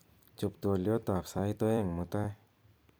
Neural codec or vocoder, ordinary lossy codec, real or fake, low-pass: vocoder, 44.1 kHz, 128 mel bands every 256 samples, BigVGAN v2; none; fake; none